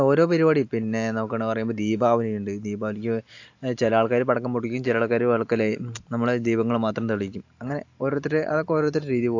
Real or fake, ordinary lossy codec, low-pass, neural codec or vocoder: real; AAC, 48 kbps; 7.2 kHz; none